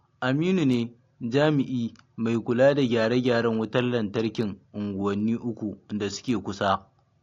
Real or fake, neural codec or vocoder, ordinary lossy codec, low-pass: real; none; AAC, 48 kbps; 7.2 kHz